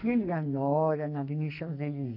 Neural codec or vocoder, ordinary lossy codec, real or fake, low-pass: codec, 44.1 kHz, 2.6 kbps, SNAC; none; fake; 5.4 kHz